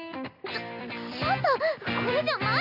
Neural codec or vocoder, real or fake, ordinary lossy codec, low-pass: none; real; none; 5.4 kHz